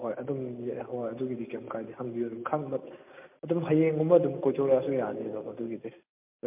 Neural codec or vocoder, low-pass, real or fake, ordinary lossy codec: none; 3.6 kHz; real; MP3, 32 kbps